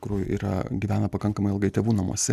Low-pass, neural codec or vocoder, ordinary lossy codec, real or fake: 14.4 kHz; none; Opus, 64 kbps; real